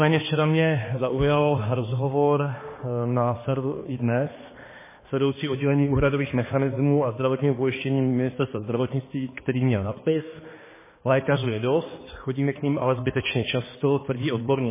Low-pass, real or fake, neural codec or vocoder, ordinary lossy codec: 3.6 kHz; fake; codec, 16 kHz, 2 kbps, X-Codec, HuBERT features, trained on balanced general audio; MP3, 16 kbps